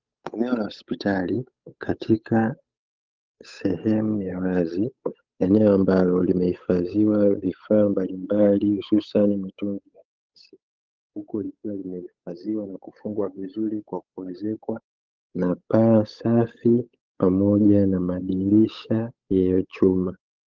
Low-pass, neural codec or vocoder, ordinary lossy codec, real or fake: 7.2 kHz; codec, 16 kHz, 8 kbps, FunCodec, trained on Chinese and English, 25 frames a second; Opus, 32 kbps; fake